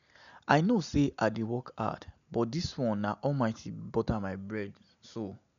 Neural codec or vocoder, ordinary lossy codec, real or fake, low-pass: none; MP3, 96 kbps; real; 7.2 kHz